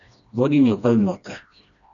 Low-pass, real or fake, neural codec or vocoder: 7.2 kHz; fake; codec, 16 kHz, 1 kbps, FreqCodec, smaller model